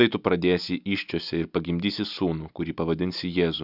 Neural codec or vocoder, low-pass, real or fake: none; 5.4 kHz; real